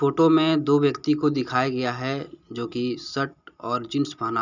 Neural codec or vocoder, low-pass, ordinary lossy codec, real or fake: none; 7.2 kHz; none; real